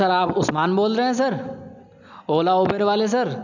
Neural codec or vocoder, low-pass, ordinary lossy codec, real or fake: none; 7.2 kHz; none; real